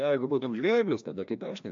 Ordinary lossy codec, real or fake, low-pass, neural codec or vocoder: AAC, 64 kbps; fake; 7.2 kHz; codec, 16 kHz, 1 kbps, FreqCodec, larger model